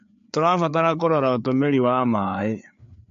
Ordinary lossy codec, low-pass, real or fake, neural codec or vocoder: MP3, 48 kbps; 7.2 kHz; fake; codec, 16 kHz, 4 kbps, FreqCodec, larger model